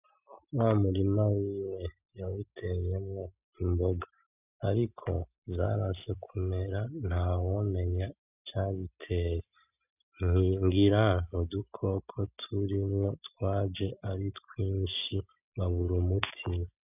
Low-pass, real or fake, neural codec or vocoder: 3.6 kHz; real; none